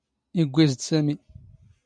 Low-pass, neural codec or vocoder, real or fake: 9.9 kHz; none; real